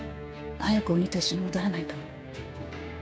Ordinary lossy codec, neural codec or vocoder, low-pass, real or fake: none; codec, 16 kHz, 6 kbps, DAC; none; fake